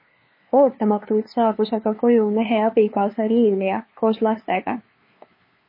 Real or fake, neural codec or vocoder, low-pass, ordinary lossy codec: fake; codec, 16 kHz, 2 kbps, FunCodec, trained on LibriTTS, 25 frames a second; 5.4 kHz; MP3, 24 kbps